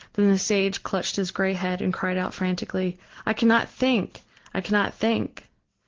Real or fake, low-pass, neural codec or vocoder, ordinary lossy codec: real; 7.2 kHz; none; Opus, 16 kbps